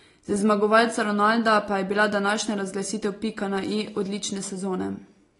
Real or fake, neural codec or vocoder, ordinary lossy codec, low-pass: real; none; AAC, 32 kbps; 10.8 kHz